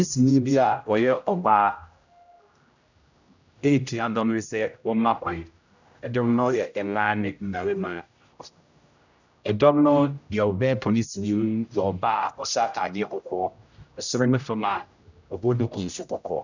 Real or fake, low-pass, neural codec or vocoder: fake; 7.2 kHz; codec, 16 kHz, 0.5 kbps, X-Codec, HuBERT features, trained on general audio